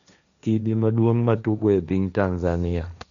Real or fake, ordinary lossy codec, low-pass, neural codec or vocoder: fake; none; 7.2 kHz; codec, 16 kHz, 1.1 kbps, Voila-Tokenizer